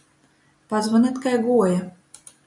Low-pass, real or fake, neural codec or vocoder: 10.8 kHz; real; none